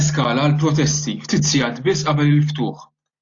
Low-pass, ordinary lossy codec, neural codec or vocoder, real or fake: 7.2 kHz; AAC, 48 kbps; none; real